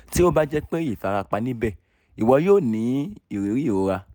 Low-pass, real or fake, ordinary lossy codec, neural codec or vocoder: none; real; none; none